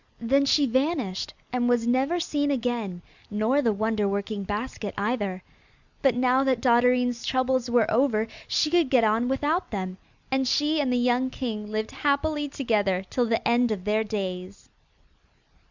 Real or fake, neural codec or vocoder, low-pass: real; none; 7.2 kHz